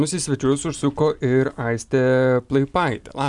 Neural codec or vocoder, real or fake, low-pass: vocoder, 44.1 kHz, 128 mel bands every 256 samples, BigVGAN v2; fake; 10.8 kHz